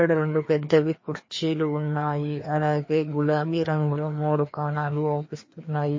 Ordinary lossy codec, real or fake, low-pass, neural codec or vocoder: MP3, 32 kbps; fake; 7.2 kHz; codec, 16 kHz, 2 kbps, FreqCodec, larger model